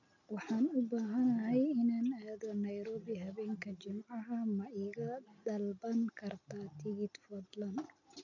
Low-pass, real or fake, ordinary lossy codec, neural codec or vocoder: 7.2 kHz; real; none; none